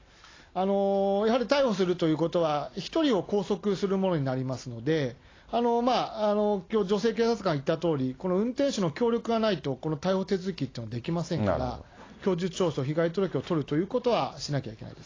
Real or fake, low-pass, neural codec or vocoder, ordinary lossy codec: real; 7.2 kHz; none; AAC, 32 kbps